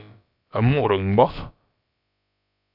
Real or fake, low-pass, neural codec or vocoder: fake; 5.4 kHz; codec, 16 kHz, about 1 kbps, DyCAST, with the encoder's durations